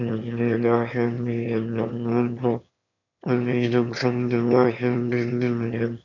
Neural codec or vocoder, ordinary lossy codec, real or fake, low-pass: autoencoder, 22.05 kHz, a latent of 192 numbers a frame, VITS, trained on one speaker; none; fake; 7.2 kHz